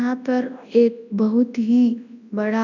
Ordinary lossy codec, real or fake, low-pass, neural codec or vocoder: none; fake; 7.2 kHz; codec, 24 kHz, 0.9 kbps, WavTokenizer, large speech release